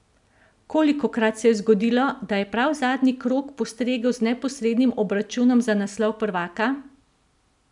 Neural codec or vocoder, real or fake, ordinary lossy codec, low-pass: none; real; none; 10.8 kHz